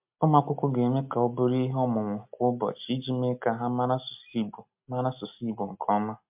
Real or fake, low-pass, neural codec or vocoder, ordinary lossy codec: real; 3.6 kHz; none; MP3, 32 kbps